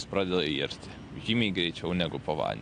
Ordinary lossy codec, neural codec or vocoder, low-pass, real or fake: AAC, 48 kbps; none; 9.9 kHz; real